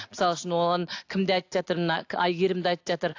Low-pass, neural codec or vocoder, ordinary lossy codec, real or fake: 7.2 kHz; none; AAC, 48 kbps; real